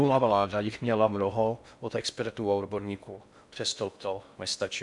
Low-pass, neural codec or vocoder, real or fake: 10.8 kHz; codec, 16 kHz in and 24 kHz out, 0.6 kbps, FocalCodec, streaming, 4096 codes; fake